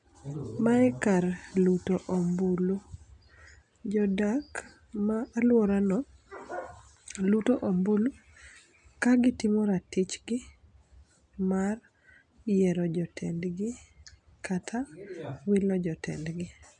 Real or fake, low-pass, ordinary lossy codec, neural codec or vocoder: real; 9.9 kHz; MP3, 96 kbps; none